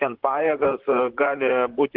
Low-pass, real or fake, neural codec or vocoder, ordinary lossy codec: 5.4 kHz; fake; vocoder, 44.1 kHz, 128 mel bands, Pupu-Vocoder; Opus, 16 kbps